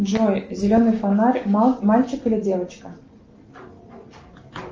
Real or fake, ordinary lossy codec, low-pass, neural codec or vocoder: real; Opus, 24 kbps; 7.2 kHz; none